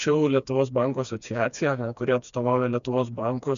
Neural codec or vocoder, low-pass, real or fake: codec, 16 kHz, 2 kbps, FreqCodec, smaller model; 7.2 kHz; fake